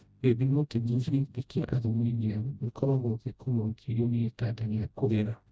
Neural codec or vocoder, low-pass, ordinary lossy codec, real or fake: codec, 16 kHz, 0.5 kbps, FreqCodec, smaller model; none; none; fake